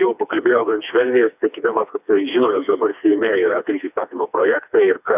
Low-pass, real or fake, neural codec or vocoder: 3.6 kHz; fake; codec, 16 kHz, 2 kbps, FreqCodec, smaller model